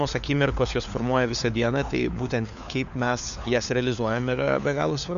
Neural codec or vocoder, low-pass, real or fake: codec, 16 kHz, 4 kbps, FunCodec, trained on LibriTTS, 50 frames a second; 7.2 kHz; fake